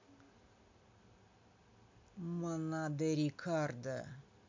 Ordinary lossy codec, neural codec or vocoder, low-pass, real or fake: MP3, 64 kbps; none; 7.2 kHz; real